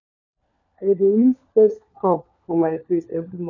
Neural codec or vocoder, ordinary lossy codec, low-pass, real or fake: codec, 16 kHz, 4 kbps, FunCodec, trained on LibriTTS, 50 frames a second; none; 7.2 kHz; fake